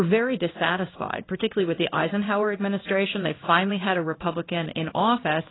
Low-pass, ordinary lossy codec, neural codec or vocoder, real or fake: 7.2 kHz; AAC, 16 kbps; codec, 16 kHz in and 24 kHz out, 1 kbps, XY-Tokenizer; fake